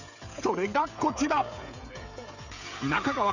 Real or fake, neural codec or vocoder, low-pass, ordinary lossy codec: fake; codec, 16 kHz, 16 kbps, FreqCodec, smaller model; 7.2 kHz; none